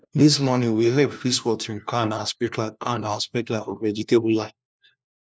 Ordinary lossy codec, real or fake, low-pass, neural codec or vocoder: none; fake; none; codec, 16 kHz, 1 kbps, FunCodec, trained on LibriTTS, 50 frames a second